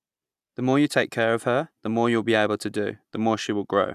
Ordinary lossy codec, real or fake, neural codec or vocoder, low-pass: none; real; none; 14.4 kHz